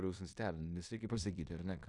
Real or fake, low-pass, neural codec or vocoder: fake; 10.8 kHz; codec, 16 kHz in and 24 kHz out, 0.9 kbps, LongCat-Audio-Codec, fine tuned four codebook decoder